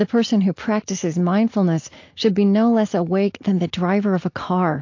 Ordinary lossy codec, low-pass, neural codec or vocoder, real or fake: AAC, 48 kbps; 7.2 kHz; none; real